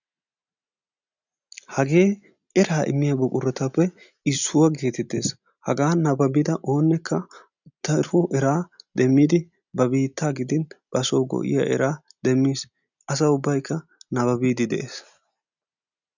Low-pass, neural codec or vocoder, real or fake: 7.2 kHz; none; real